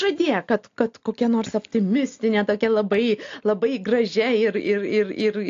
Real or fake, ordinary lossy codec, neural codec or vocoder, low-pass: real; AAC, 48 kbps; none; 7.2 kHz